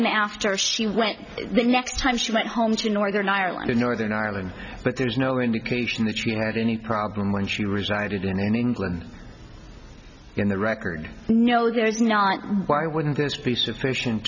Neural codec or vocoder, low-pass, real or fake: none; 7.2 kHz; real